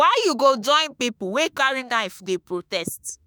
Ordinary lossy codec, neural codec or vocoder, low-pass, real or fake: none; autoencoder, 48 kHz, 32 numbers a frame, DAC-VAE, trained on Japanese speech; none; fake